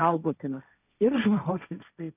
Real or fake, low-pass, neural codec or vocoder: fake; 3.6 kHz; codec, 16 kHz, 1.1 kbps, Voila-Tokenizer